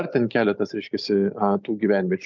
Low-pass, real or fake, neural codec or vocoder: 7.2 kHz; real; none